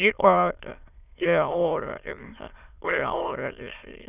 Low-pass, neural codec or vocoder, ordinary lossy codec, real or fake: 3.6 kHz; autoencoder, 22.05 kHz, a latent of 192 numbers a frame, VITS, trained on many speakers; none; fake